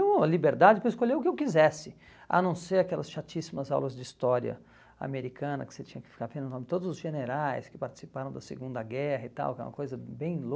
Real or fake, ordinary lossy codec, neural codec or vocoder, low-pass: real; none; none; none